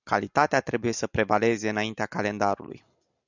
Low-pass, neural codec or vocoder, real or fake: 7.2 kHz; none; real